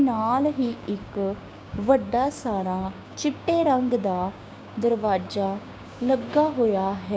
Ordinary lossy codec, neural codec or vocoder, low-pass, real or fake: none; codec, 16 kHz, 6 kbps, DAC; none; fake